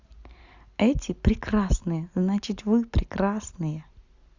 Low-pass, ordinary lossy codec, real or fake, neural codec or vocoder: 7.2 kHz; Opus, 64 kbps; real; none